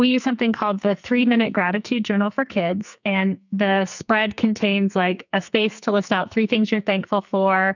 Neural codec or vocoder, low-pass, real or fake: codec, 44.1 kHz, 2.6 kbps, SNAC; 7.2 kHz; fake